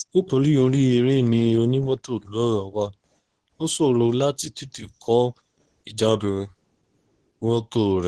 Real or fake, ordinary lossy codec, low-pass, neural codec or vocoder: fake; Opus, 16 kbps; 10.8 kHz; codec, 24 kHz, 0.9 kbps, WavTokenizer, medium speech release version 2